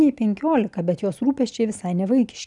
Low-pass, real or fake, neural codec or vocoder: 10.8 kHz; real; none